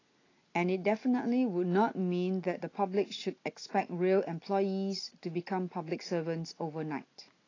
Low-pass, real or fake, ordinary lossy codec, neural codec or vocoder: 7.2 kHz; real; AAC, 32 kbps; none